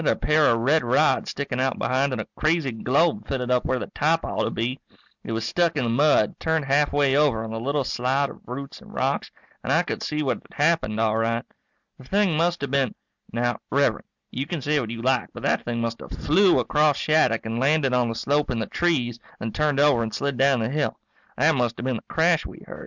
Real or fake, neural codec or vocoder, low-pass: real; none; 7.2 kHz